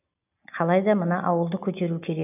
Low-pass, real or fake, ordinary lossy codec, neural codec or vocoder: 3.6 kHz; real; none; none